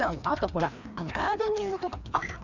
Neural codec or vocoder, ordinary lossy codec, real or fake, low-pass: codec, 16 kHz, 2 kbps, FreqCodec, larger model; none; fake; 7.2 kHz